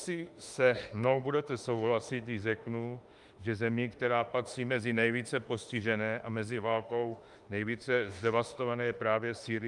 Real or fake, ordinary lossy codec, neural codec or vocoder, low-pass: fake; Opus, 32 kbps; autoencoder, 48 kHz, 32 numbers a frame, DAC-VAE, trained on Japanese speech; 10.8 kHz